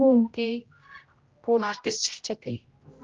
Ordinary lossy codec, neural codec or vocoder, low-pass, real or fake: Opus, 24 kbps; codec, 16 kHz, 0.5 kbps, X-Codec, HuBERT features, trained on general audio; 7.2 kHz; fake